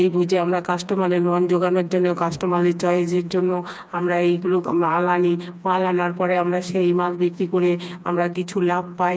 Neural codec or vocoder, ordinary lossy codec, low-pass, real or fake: codec, 16 kHz, 2 kbps, FreqCodec, smaller model; none; none; fake